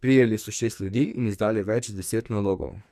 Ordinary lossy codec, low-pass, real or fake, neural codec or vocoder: none; 14.4 kHz; fake; codec, 44.1 kHz, 2.6 kbps, SNAC